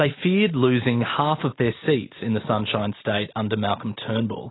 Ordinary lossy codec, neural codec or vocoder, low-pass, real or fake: AAC, 16 kbps; none; 7.2 kHz; real